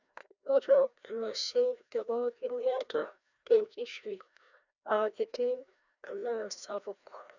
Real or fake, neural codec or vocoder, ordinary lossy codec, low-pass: fake; codec, 16 kHz, 1 kbps, FreqCodec, larger model; none; 7.2 kHz